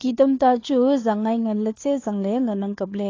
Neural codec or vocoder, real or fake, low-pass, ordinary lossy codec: codec, 16 kHz, 2 kbps, FunCodec, trained on Chinese and English, 25 frames a second; fake; 7.2 kHz; AAC, 32 kbps